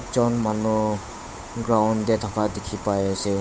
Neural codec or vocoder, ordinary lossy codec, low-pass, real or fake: codec, 16 kHz, 8 kbps, FunCodec, trained on Chinese and English, 25 frames a second; none; none; fake